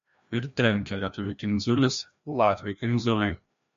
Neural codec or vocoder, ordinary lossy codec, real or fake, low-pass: codec, 16 kHz, 1 kbps, FreqCodec, larger model; MP3, 48 kbps; fake; 7.2 kHz